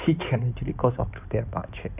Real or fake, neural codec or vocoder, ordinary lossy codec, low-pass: fake; codec, 16 kHz in and 24 kHz out, 2.2 kbps, FireRedTTS-2 codec; none; 3.6 kHz